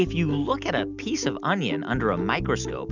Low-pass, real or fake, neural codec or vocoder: 7.2 kHz; real; none